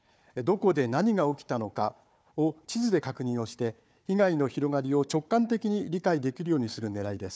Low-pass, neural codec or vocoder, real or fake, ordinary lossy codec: none; codec, 16 kHz, 4 kbps, FunCodec, trained on Chinese and English, 50 frames a second; fake; none